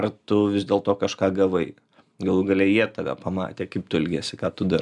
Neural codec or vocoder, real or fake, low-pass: none; real; 10.8 kHz